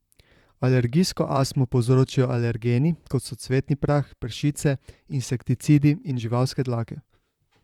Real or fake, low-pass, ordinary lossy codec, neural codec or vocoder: fake; 19.8 kHz; none; vocoder, 44.1 kHz, 128 mel bands, Pupu-Vocoder